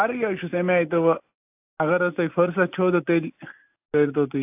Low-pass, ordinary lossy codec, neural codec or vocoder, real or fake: 3.6 kHz; AAC, 32 kbps; none; real